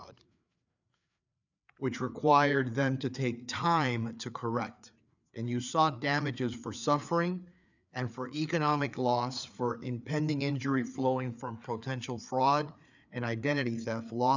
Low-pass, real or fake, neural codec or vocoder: 7.2 kHz; fake; codec, 16 kHz, 4 kbps, FreqCodec, larger model